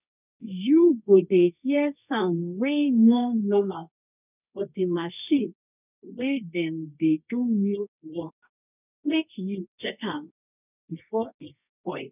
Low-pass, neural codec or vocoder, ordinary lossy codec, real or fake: 3.6 kHz; codec, 24 kHz, 0.9 kbps, WavTokenizer, medium music audio release; none; fake